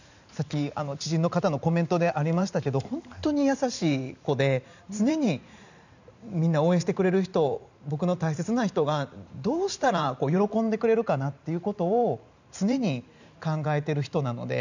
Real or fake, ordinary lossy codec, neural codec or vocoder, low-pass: fake; none; vocoder, 44.1 kHz, 128 mel bands every 512 samples, BigVGAN v2; 7.2 kHz